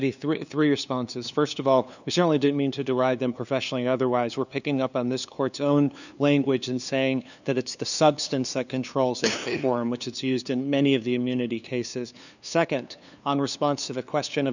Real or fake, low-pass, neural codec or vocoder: fake; 7.2 kHz; codec, 16 kHz, 2 kbps, FunCodec, trained on LibriTTS, 25 frames a second